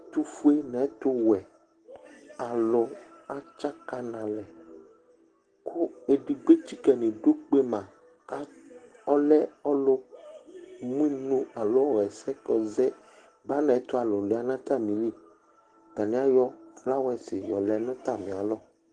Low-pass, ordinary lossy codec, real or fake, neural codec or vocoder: 9.9 kHz; Opus, 16 kbps; real; none